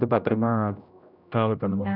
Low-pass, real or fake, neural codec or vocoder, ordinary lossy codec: 5.4 kHz; fake; codec, 16 kHz, 0.5 kbps, X-Codec, HuBERT features, trained on general audio; none